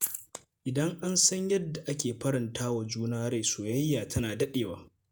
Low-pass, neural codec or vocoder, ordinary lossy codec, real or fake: none; vocoder, 48 kHz, 128 mel bands, Vocos; none; fake